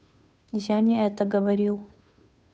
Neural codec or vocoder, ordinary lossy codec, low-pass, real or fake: codec, 16 kHz, 2 kbps, FunCodec, trained on Chinese and English, 25 frames a second; none; none; fake